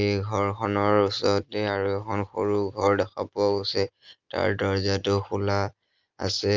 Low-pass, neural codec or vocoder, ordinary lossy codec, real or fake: 7.2 kHz; none; Opus, 24 kbps; real